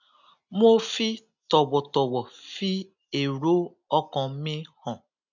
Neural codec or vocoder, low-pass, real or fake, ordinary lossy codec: none; 7.2 kHz; real; none